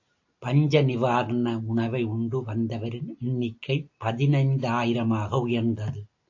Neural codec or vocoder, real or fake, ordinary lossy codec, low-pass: none; real; AAC, 48 kbps; 7.2 kHz